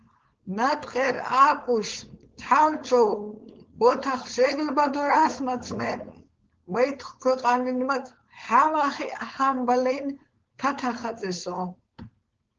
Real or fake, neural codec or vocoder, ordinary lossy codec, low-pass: fake; codec, 16 kHz, 4.8 kbps, FACodec; Opus, 16 kbps; 7.2 kHz